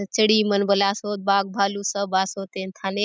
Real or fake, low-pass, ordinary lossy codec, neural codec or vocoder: real; none; none; none